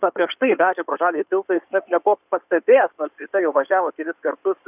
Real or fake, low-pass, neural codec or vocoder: fake; 3.6 kHz; codec, 16 kHz in and 24 kHz out, 2.2 kbps, FireRedTTS-2 codec